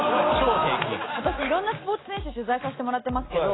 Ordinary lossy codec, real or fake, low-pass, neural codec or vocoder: AAC, 16 kbps; real; 7.2 kHz; none